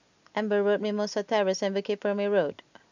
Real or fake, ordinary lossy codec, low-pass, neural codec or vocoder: real; none; 7.2 kHz; none